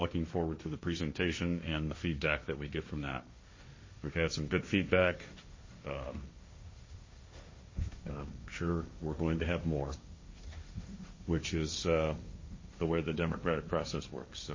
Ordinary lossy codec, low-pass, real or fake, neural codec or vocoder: MP3, 32 kbps; 7.2 kHz; fake; codec, 16 kHz, 1.1 kbps, Voila-Tokenizer